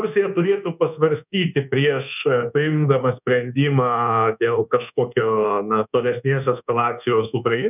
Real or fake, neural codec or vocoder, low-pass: fake; codec, 24 kHz, 1.2 kbps, DualCodec; 3.6 kHz